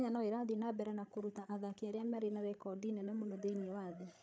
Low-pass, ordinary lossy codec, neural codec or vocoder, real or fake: none; none; codec, 16 kHz, 8 kbps, FreqCodec, larger model; fake